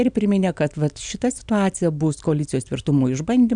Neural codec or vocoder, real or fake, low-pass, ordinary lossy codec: none; real; 9.9 kHz; MP3, 96 kbps